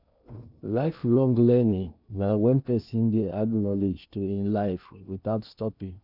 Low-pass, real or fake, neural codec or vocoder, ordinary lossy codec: 5.4 kHz; fake; codec, 16 kHz in and 24 kHz out, 0.8 kbps, FocalCodec, streaming, 65536 codes; none